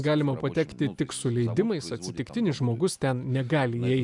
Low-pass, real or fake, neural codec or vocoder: 10.8 kHz; real; none